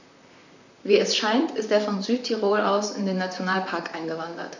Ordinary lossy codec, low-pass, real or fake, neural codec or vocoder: none; 7.2 kHz; fake; vocoder, 44.1 kHz, 128 mel bands, Pupu-Vocoder